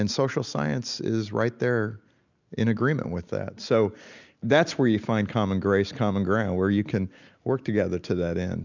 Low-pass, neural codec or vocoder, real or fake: 7.2 kHz; none; real